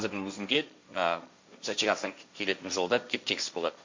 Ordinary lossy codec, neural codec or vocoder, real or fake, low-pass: MP3, 64 kbps; codec, 16 kHz, 1.1 kbps, Voila-Tokenizer; fake; 7.2 kHz